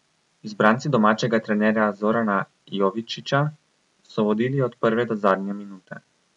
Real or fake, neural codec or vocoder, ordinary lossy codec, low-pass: real; none; none; 10.8 kHz